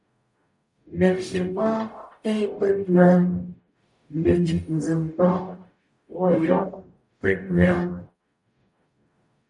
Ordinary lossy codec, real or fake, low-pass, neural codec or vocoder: MP3, 96 kbps; fake; 10.8 kHz; codec, 44.1 kHz, 0.9 kbps, DAC